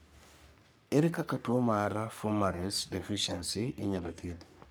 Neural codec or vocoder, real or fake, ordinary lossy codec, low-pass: codec, 44.1 kHz, 3.4 kbps, Pupu-Codec; fake; none; none